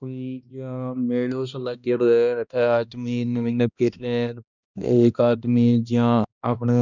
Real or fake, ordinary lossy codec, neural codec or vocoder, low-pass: fake; none; codec, 16 kHz, 1 kbps, X-Codec, HuBERT features, trained on balanced general audio; 7.2 kHz